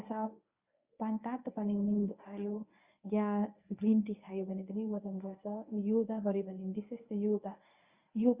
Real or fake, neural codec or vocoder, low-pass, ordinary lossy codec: fake; codec, 24 kHz, 0.9 kbps, WavTokenizer, medium speech release version 1; 3.6 kHz; Opus, 64 kbps